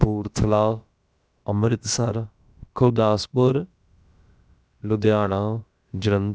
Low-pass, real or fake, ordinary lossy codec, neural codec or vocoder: none; fake; none; codec, 16 kHz, about 1 kbps, DyCAST, with the encoder's durations